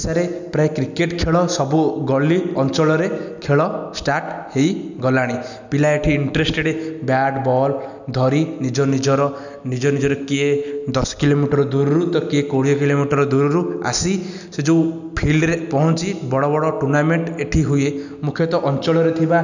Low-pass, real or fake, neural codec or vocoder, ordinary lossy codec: 7.2 kHz; real; none; none